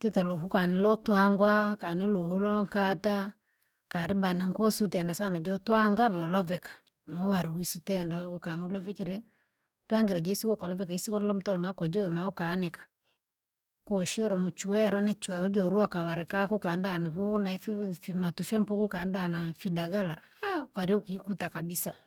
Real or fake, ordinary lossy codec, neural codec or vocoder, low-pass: fake; none; codec, 44.1 kHz, 2.6 kbps, DAC; 19.8 kHz